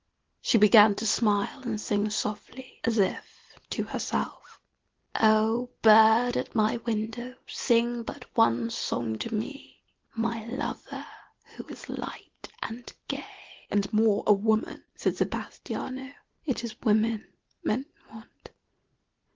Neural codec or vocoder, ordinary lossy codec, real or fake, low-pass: none; Opus, 16 kbps; real; 7.2 kHz